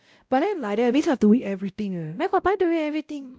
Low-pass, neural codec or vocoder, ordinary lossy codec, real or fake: none; codec, 16 kHz, 0.5 kbps, X-Codec, WavLM features, trained on Multilingual LibriSpeech; none; fake